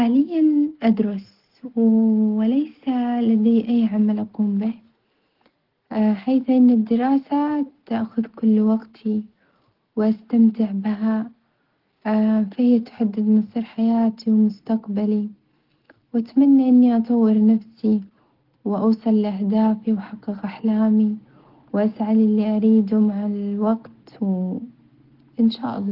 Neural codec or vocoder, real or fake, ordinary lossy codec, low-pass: none; real; Opus, 16 kbps; 5.4 kHz